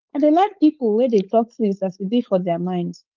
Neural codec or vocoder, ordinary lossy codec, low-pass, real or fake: codec, 16 kHz, 4.8 kbps, FACodec; Opus, 32 kbps; 7.2 kHz; fake